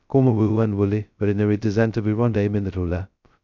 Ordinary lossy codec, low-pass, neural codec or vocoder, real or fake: none; 7.2 kHz; codec, 16 kHz, 0.2 kbps, FocalCodec; fake